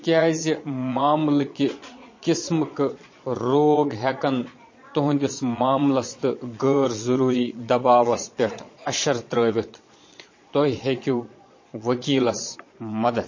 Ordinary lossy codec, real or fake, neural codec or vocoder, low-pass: MP3, 32 kbps; fake; vocoder, 22.05 kHz, 80 mel bands, Vocos; 7.2 kHz